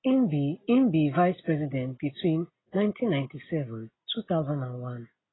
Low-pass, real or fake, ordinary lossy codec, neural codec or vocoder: 7.2 kHz; real; AAC, 16 kbps; none